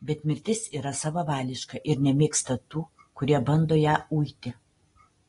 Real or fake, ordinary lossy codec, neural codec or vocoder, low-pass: real; AAC, 32 kbps; none; 10.8 kHz